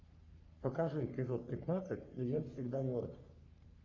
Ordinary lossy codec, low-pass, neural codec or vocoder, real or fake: Opus, 64 kbps; 7.2 kHz; codec, 44.1 kHz, 3.4 kbps, Pupu-Codec; fake